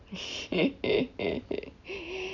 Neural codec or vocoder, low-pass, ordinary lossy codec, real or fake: none; 7.2 kHz; none; real